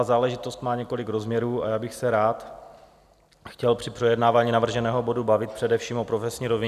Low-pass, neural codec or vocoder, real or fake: 14.4 kHz; none; real